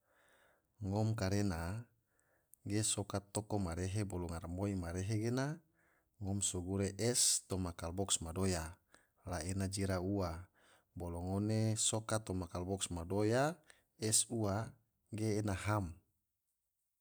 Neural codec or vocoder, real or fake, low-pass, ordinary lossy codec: vocoder, 44.1 kHz, 128 mel bands every 256 samples, BigVGAN v2; fake; none; none